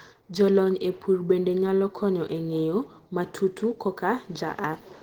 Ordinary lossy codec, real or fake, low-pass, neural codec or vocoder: Opus, 16 kbps; real; 19.8 kHz; none